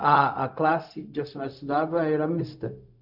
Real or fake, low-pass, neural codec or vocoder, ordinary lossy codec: fake; 5.4 kHz; codec, 16 kHz, 0.4 kbps, LongCat-Audio-Codec; none